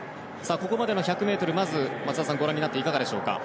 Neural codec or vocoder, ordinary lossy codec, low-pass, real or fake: none; none; none; real